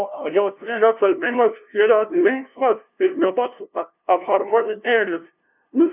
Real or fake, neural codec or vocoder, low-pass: fake; codec, 16 kHz, 0.5 kbps, FunCodec, trained on LibriTTS, 25 frames a second; 3.6 kHz